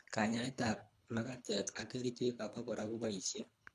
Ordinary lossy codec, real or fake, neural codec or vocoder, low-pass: none; fake; codec, 24 kHz, 3 kbps, HILCodec; none